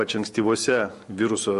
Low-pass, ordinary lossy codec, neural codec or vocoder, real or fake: 14.4 kHz; MP3, 48 kbps; none; real